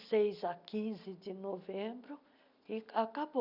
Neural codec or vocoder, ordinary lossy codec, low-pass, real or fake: none; none; 5.4 kHz; real